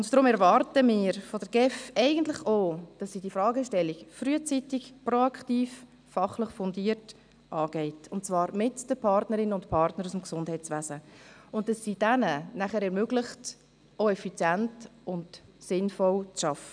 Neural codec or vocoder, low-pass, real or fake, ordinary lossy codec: none; 9.9 kHz; real; none